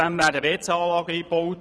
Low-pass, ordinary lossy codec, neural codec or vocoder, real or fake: none; none; vocoder, 22.05 kHz, 80 mel bands, Vocos; fake